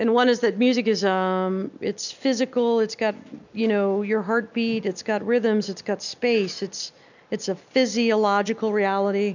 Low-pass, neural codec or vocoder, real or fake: 7.2 kHz; none; real